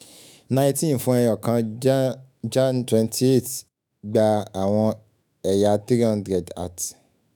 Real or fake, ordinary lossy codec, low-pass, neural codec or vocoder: fake; none; none; autoencoder, 48 kHz, 128 numbers a frame, DAC-VAE, trained on Japanese speech